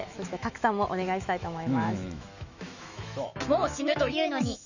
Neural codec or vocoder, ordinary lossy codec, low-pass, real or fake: autoencoder, 48 kHz, 128 numbers a frame, DAC-VAE, trained on Japanese speech; none; 7.2 kHz; fake